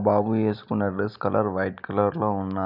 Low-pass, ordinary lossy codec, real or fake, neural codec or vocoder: 5.4 kHz; none; real; none